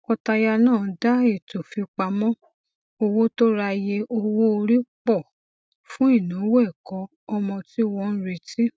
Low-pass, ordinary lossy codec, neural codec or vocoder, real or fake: none; none; none; real